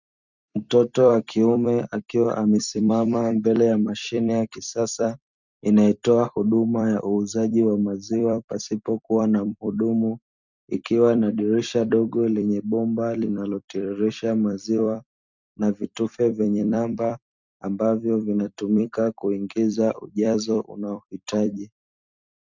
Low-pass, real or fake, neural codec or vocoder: 7.2 kHz; fake; vocoder, 44.1 kHz, 128 mel bands every 256 samples, BigVGAN v2